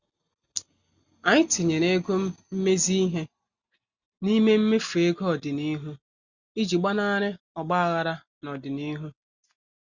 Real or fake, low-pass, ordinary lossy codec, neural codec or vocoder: real; 7.2 kHz; Opus, 64 kbps; none